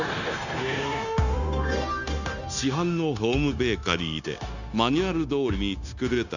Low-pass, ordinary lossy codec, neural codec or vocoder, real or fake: 7.2 kHz; none; codec, 16 kHz, 0.9 kbps, LongCat-Audio-Codec; fake